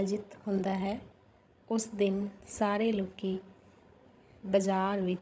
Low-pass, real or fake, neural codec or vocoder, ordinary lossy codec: none; fake; codec, 16 kHz, 16 kbps, FreqCodec, larger model; none